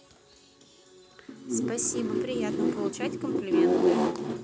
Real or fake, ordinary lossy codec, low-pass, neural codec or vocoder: real; none; none; none